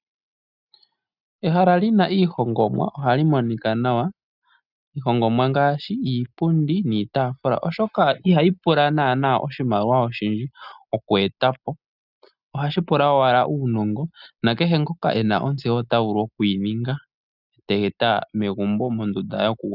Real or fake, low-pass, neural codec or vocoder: real; 5.4 kHz; none